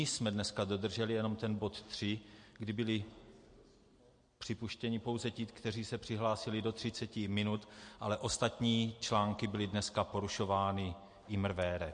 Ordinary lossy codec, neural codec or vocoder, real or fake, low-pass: MP3, 48 kbps; none; real; 9.9 kHz